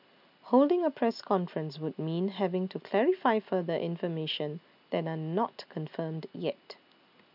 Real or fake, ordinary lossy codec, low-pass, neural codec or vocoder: real; none; 5.4 kHz; none